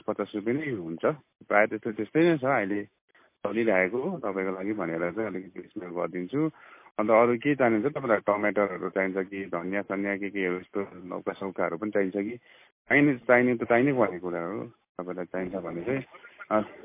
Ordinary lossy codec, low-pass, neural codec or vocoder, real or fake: MP3, 24 kbps; 3.6 kHz; none; real